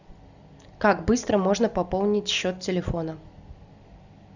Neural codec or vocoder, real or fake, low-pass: none; real; 7.2 kHz